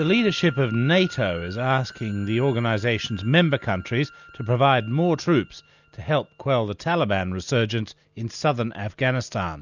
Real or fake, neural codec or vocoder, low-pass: real; none; 7.2 kHz